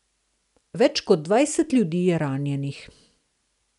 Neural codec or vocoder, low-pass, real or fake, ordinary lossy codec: none; 10.8 kHz; real; none